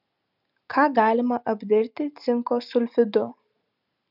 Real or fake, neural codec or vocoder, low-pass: real; none; 5.4 kHz